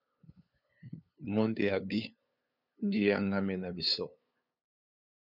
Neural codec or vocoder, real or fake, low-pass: codec, 16 kHz, 2 kbps, FunCodec, trained on LibriTTS, 25 frames a second; fake; 5.4 kHz